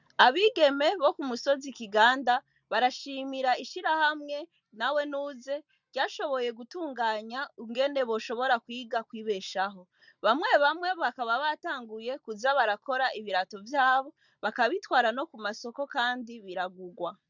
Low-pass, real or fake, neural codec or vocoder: 7.2 kHz; real; none